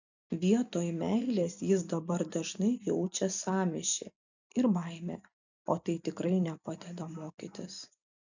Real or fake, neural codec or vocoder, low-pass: real; none; 7.2 kHz